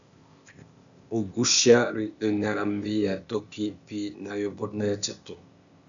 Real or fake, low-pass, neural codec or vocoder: fake; 7.2 kHz; codec, 16 kHz, 0.8 kbps, ZipCodec